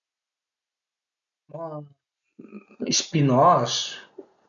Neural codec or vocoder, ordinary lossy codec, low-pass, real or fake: none; none; 7.2 kHz; real